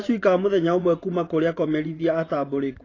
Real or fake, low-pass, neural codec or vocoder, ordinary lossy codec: fake; 7.2 kHz; vocoder, 24 kHz, 100 mel bands, Vocos; AAC, 32 kbps